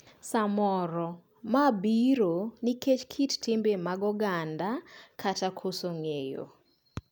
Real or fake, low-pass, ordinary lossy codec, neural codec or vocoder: real; none; none; none